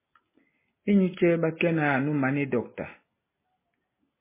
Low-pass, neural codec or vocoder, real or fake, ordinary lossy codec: 3.6 kHz; none; real; MP3, 16 kbps